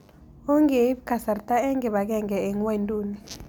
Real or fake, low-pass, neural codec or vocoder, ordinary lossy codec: real; none; none; none